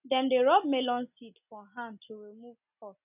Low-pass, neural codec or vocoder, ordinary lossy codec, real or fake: 3.6 kHz; none; none; real